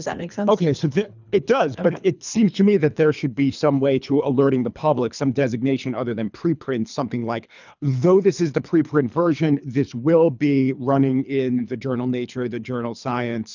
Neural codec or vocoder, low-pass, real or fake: codec, 24 kHz, 3 kbps, HILCodec; 7.2 kHz; fake